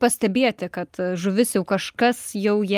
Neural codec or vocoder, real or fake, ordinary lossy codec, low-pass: none; real; Opus, 32 kbps; 14.4 kHz